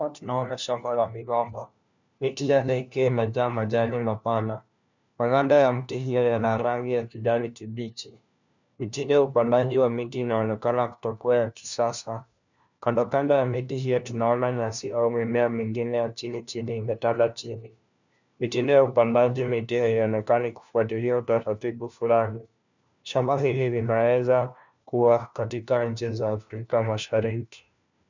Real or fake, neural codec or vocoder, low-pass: fake; codec, 16 kHz, 1 kbps, FunCodec, trained on LibriTTS, 50 frames a second; 7.2 kHz